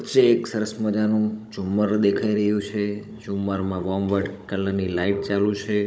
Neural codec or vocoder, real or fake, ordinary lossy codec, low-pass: codec, 16 kHz, 16 kbps, FunCodec, trained on Chinese and English, 50 frames a second; fake; none; none